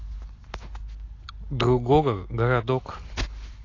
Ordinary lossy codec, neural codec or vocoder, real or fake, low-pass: AAC, 32 kbps; none; real; 7.2 kHz